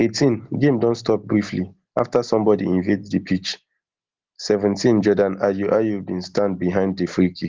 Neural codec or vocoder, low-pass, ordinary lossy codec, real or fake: none; 7.2 kHz; Opus, 16 kbps; real